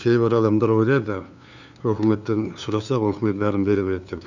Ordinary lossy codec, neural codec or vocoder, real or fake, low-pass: none; codec, 16 kHz, 2 kbps, FunCodec, trained on LibriTTS, 25 frames a second; fake; 7.2 kHz